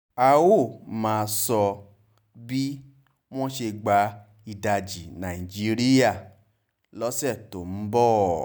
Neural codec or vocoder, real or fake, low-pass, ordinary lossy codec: none; real; none; none